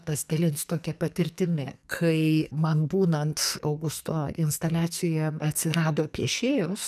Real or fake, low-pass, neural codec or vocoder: fake; 14.4 kHz; codec, 44.1 kHz, 2.6 kbps, SNAC